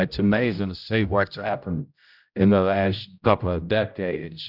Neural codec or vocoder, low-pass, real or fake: codec, 16 kHz, 0.5 kbps, X-Codec, HuBERT features, trained on general audio; 5.4 kHz; fake